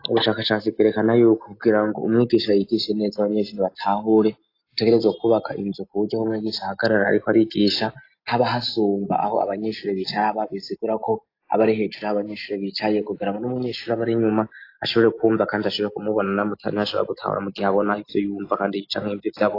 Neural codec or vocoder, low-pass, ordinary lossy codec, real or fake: none; 5.4 kHz; AAC, 32 kbps; real